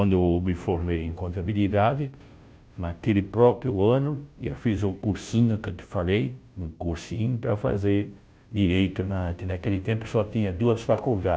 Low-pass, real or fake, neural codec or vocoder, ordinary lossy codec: none; fake; codec, 16 kHz, 0.5 kbps, FunCodec, trained on Chinese and English, 25 frames a second; none